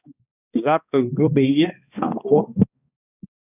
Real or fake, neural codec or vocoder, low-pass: fake; codec, 16 kHz, 1 kbps, X-Codec, HuBERT features, trained on balanced general audio; 3.6 kHz